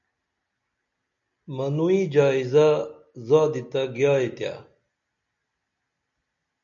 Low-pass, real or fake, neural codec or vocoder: 7.2 kHz; real; none